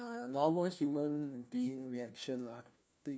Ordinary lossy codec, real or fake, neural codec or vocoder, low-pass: none; fake; codec, 16 kHz, 1 kbps, FunCodec, trained on LibriTTS, 50 frames a second; none